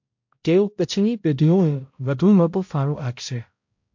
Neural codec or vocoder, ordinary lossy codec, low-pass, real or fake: codec, 16 kHz, 0.5 kbps, X-Codec, HuBERT features, trained on balanced general audio; MP3, 48 kbps; 7.2 kHz; fake